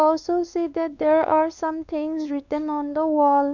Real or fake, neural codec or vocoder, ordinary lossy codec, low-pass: fake; codec, 16 kHz, 2 kbps, X-Codec, WavLM features, trained on Multilingual LibriSpeech; none; 7.2 kHz